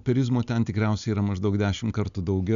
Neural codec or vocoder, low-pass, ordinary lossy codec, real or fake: none; 7.2 kHz; MP3, 96 kbps; real